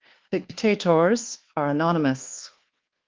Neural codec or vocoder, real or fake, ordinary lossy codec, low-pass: codec, 24 kHz, 1.2 kbps, DualCodec; fake; Opus, 16 kbps; 7.2 kHz